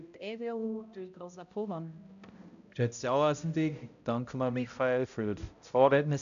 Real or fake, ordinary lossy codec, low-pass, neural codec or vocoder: fake; none; 7.2 kHz; codec, 16 kHz, 0.5 kbps, X-Codec, HuBERT features, trained on balanced general audio